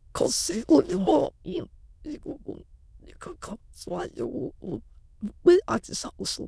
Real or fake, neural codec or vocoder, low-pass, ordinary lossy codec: fake; autoencoder, 22.05 kHz, a latent of 192 numbers a frame, VITS, trained on many speakers; none; none